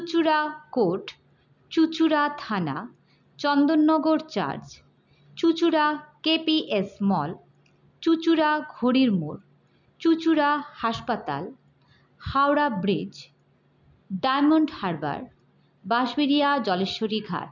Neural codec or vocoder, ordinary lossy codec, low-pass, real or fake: none; none; 7.2 kHz; real